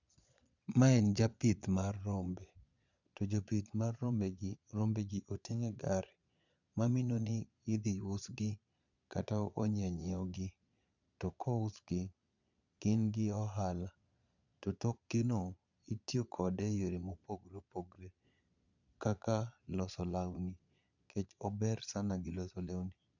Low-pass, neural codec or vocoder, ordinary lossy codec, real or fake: 7.2 kHz; vocoder, 22.05 kHz, 80 mel bands, Vocos; none; fake